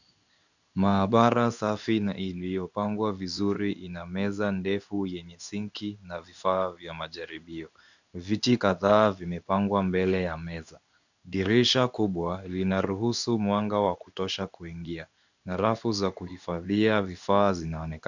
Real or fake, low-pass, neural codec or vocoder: fake; 7.2 kHz; codec, 16 kHz in and 24 kHz out, 1 kbps, XY-Tokenizer